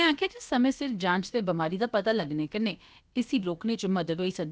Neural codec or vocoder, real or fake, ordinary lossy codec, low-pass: codec, 16 kHz, about 1 kbps, DyCAST, with the encoder's durations; fake; none; none